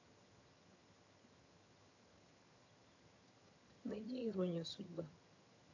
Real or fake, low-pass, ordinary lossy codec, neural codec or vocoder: fake; 7.2 kHz; AAC, 48 kbps; vocoder, 22.05 kHz, 80 mel bands, HiFi-GAN